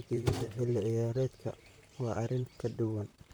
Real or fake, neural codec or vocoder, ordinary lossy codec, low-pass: fake; vocoder, 44.1 kHz, 128 mel bands, Pupu-Vocoder; none; none